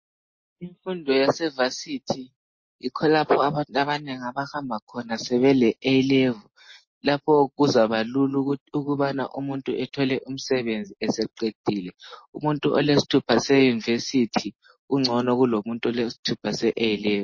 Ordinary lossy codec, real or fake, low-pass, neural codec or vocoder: MP3, 32 kbps; real; 7.2 kHz; none